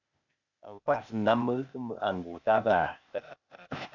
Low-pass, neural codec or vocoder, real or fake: 7.2 kHz; codec, 16 kHz, 0.8 kbps, ZipCodec; fake